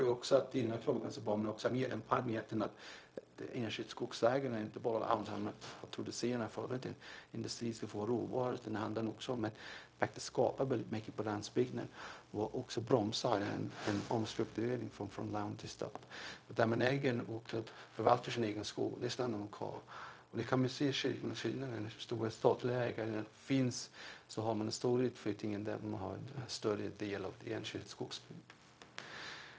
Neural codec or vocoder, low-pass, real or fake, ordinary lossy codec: codec, 16 kHz, 0.4 kbps, LongCat-Audio-Codec; none; fake; none